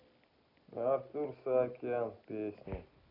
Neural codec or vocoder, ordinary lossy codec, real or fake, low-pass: none; none; real; 5.4 kHz